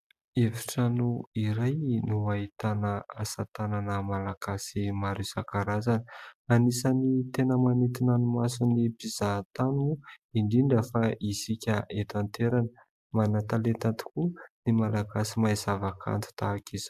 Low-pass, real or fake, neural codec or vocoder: 14.4 kHz; real; none